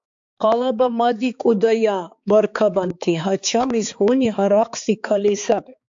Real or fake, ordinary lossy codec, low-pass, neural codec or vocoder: fake; AAC, 64 kbps; 7.2 kHz; codec, 16 kHz, 4 kbps, X-Codec, HuBERT features, trained on balanced general audio